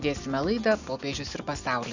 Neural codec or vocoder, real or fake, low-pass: none; real; 7.2 kHz